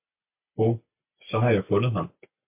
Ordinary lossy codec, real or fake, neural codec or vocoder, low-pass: MP3, 32 kbps; real; none; 3.6 kHz